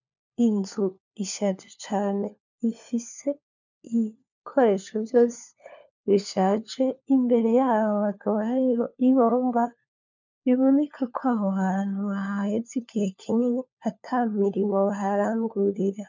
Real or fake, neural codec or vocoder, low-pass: fake; codec, 16 kHz, 4 kbps, FunCodec, trained on LibriTTS, 50 frames a second; 7.2 kHz